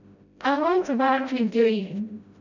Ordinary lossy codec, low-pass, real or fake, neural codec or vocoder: none; 7.2 kHz; fake; codec, 16 kHz, 0.5 kbps, FreqCodec, smaller model